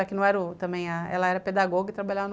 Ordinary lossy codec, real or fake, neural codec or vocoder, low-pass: none; real; none; none